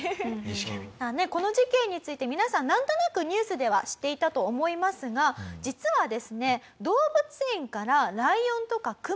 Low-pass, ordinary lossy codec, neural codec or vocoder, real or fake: none; none; none; real